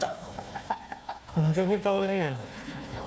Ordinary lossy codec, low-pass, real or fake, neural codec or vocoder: none; none; fake; codec, 16 kHz, 1 kbps, FunCodec, trained on Chinese and English, 50 frames a second